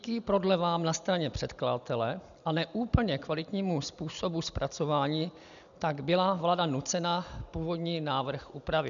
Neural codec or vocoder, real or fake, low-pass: none; real; 7.2 kHz